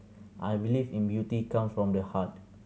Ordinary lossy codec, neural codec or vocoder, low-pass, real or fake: none; none; none; real